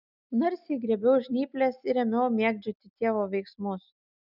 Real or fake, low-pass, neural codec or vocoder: real; 5.4 kHz; none